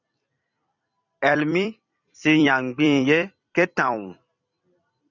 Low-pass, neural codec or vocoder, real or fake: 7.2 kHz; vocoder, 44.1 kHz, 128 mel bands every 256 samples, BigVGAN v2; fake